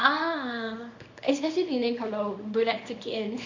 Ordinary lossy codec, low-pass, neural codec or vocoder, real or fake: MP3, 48 kbps; 7.2 kHz; codec, 24 kHz, 0.9 kbps, WavTokenizer, small release; fake